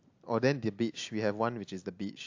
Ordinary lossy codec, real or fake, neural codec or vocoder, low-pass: AAC, 48 kbps; real; none; 7.2 kHz